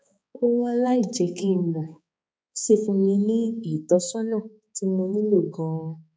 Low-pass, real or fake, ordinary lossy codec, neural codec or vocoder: none; fake; none; codec, 16 kHz, 4 kbps, X-Codec, HuBERT features, trained on balanced general audio